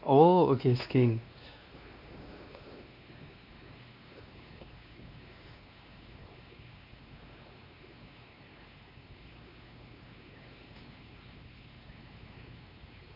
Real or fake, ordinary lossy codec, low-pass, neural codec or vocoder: fake; MP3, 48 kbps; 5.4 kHz; codec, 16 kHz, 2 kbps, X-Codec, WavLM features, trained on Multilingual LibriSpeech